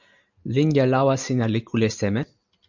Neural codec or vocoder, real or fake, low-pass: none; real; 7.2 kHz